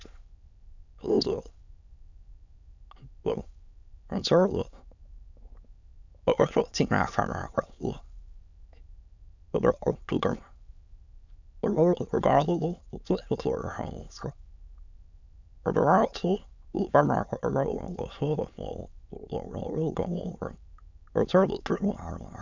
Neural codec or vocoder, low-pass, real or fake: autoencoder, 22.05 kHz, a latent of 192 numbers a frame, VITS, trained on many speakers; 7.2 kHz; fake